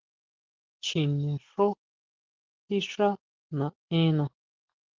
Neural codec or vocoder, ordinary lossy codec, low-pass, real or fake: none; Opus, 16 kbps; 7.2 kHz; real